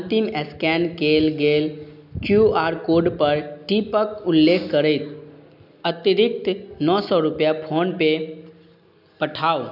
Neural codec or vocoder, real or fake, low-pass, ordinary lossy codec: none; real; 5.4 kHz; none